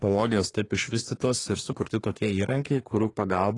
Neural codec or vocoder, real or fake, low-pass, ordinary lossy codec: codec, 24 kHz, 1 kbps, SNAC; fake; 10.8 kHz; AAC, 32 kbps